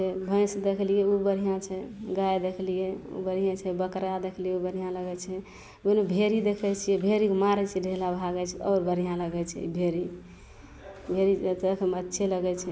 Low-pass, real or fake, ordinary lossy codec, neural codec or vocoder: none; real; none; none